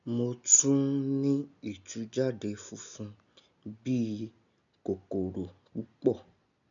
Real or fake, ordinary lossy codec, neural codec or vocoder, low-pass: real; none; none; 7.2 kHz